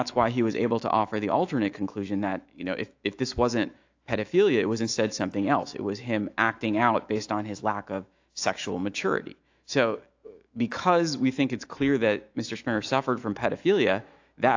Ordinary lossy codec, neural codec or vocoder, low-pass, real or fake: AAC, 48 kbps; autoencoder, 48 kHz, 128 numbers a frame, DAC-VAE, trained on Japanese speech; 7.2 kHz; fake